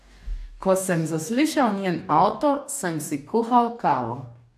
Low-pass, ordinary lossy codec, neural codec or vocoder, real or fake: 14.4 kHz; AAC, 96 kbps; codec, 44.1 kHz, 2.6 kbps, DAC; fake